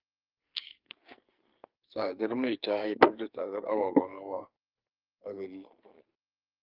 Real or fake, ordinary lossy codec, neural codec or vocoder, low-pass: fake; Opus, 32 kbps; codec, 44.1 kHz, 2.6 kbps, SNAC; 5.4 kHz